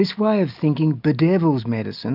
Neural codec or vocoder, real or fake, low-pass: none; real; 5.4 kHz